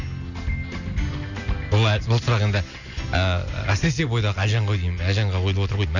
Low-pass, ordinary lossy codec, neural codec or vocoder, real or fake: 7.2 kHz; none; none; real